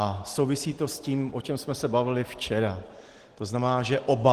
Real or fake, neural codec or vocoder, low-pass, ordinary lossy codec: real; none; 14.4 kHz; Opus, 16 kbps